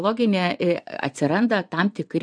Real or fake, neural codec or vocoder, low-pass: fake; vocoder, 24 kHz, 100 mel bands, Vocos; 9.9 kHz